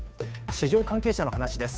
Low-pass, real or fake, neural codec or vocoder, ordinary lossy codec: none; fake; codec, 16 kHz, 2 kbps, FunCodec, trained on Chinese and English, 25 frames a second; none